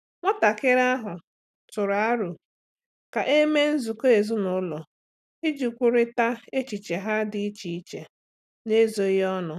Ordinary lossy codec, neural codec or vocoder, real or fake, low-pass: none; none; real; 14.4 kHz